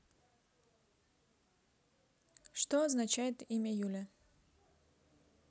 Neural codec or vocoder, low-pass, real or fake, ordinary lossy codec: none; none; real; none